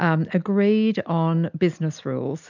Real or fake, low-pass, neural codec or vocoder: real; 7.2 kHz; none